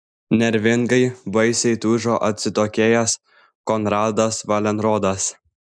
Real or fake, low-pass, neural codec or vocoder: real; 9.9 kHz; none